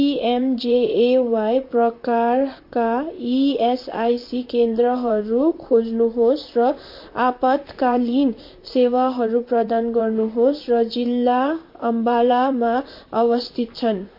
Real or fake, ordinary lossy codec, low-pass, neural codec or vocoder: fake; MP3, 32 kbps; 5.4 kHz; vocoder, 44.1 kHz, 128 mel bands, Pupu-Vocoder